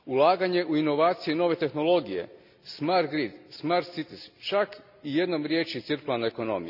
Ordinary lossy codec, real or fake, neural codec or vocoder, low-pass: none; real; none; 5.4 kHz